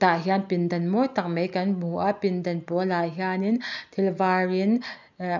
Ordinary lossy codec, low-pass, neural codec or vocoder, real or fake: none; 7.2 kHz; none; real